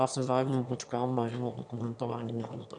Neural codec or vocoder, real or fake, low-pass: autoencoder, 22.05 kHz, a latent of 192 numbers a frame, VITS, trained on one speaker; fake; 9.9 kHz